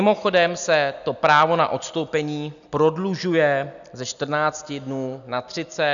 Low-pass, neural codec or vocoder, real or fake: 7.2 kHz; none; real